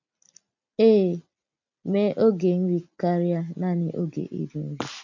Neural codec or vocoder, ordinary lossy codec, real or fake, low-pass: none; none; real; 7.2 kHz